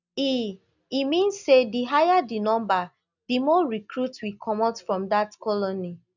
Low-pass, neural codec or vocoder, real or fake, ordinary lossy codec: 7.2 kHz; none; real; none